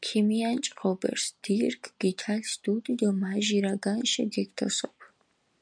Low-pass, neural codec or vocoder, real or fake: 9.9 kHz; none; real